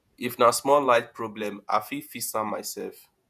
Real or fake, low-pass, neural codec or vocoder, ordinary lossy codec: fake; 14.4 kHz; vocoder, 48 kHz, 128 mel bands, Vocos; none